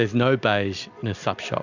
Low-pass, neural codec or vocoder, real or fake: 7.2 kHz; none; real